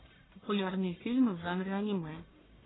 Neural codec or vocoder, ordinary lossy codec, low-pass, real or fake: codec, 44.1 kHz, 1.7 kbps, Pupu-Codec; AAC, 16 kbps; 7.2 kHz; fake